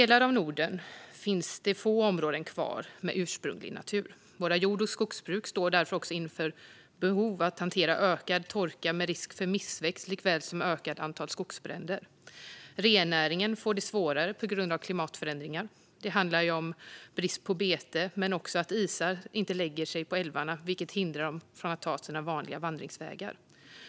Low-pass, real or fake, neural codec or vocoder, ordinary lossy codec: none; real; none; none